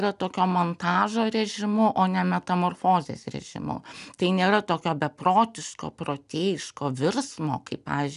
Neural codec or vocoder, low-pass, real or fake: none; 10.8 kHz; real